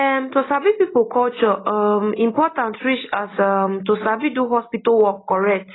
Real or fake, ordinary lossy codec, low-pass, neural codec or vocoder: real; AAC, 16 kbps; 7.2 kHz; none